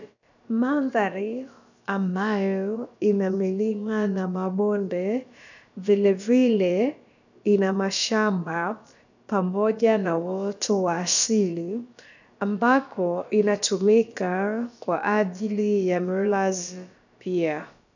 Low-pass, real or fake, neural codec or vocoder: 7.2 kHz; fake; codec, 16 kHz, about 1 kbps, DyCAST, with the encoder's durations